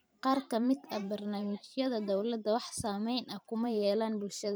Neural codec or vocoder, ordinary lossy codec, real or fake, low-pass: vocoder, 44.1 kHz, 128 mel bands every 512 samples, BigVGAN v2; none; fake; none